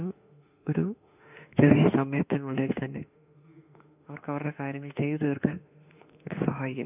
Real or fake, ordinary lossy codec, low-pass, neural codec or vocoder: fake; none; 3.6 kHz; autoencoder, 48 kHz, 32 numbers a frame, DAC-VAE, trained on Japanese speech